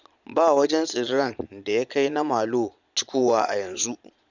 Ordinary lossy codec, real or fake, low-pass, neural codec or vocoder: none; fake; 7.2 kHz; vocoder, 22.05 kHz, 80 mel bands, Vocos